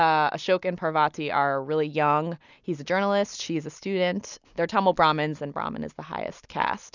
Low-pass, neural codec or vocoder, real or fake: 7.2 kHz; none; real